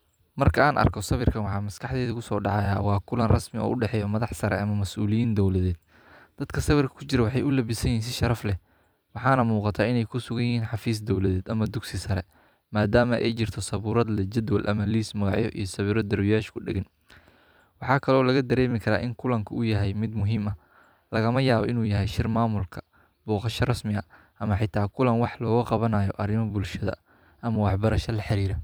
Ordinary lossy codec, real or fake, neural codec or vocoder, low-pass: none; fake; vocoder, 44.1 kHz, 128 mel bands every 256 samples, BigVGAN v2; none